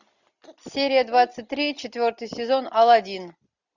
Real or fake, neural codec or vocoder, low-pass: real; none; 7.2 kHz